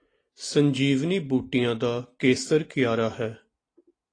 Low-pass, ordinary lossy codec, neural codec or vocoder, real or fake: 9.9 kHz; AAC, 32 kbps; none; real